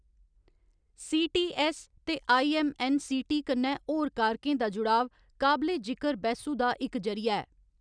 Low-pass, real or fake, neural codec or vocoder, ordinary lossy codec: 9.9 kHz; real; none; none